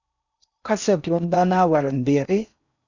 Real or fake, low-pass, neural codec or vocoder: fake; 7.2 kHz; codec, 16 kHz in and 24 kHz out, 0.6 kbps, FocalCodec, streaming, 4096 codes